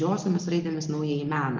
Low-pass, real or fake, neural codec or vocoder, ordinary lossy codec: 7.2 kHz; real; none; Opus, 32 kbps